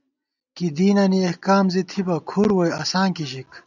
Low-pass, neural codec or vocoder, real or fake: 7.2 kHz; none; real